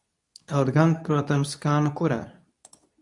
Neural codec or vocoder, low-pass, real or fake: codec, 24 kHz, 0.9 kbps, WavTokenizer, medium speech release version 2; 10.8 kHz; fake